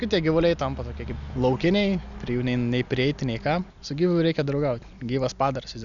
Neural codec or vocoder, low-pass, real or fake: none; 7.2 kHz; real